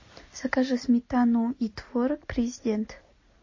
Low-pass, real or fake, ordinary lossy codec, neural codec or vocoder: 7.2 kHz; real; MP3, 32 kbps; none